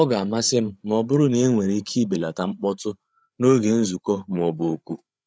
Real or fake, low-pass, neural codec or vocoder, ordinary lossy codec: fake; none; codec, 16 kHz, 8 kbps, FreqCodec, larger model; none